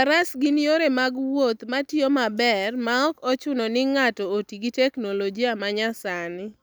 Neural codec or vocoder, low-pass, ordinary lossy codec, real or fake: none; none; none; real